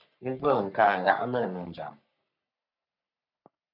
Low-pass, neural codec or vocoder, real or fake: 5.4 kHz; codec, 44.1 kHz, 3.4 kbps, Pupu-Codec; fake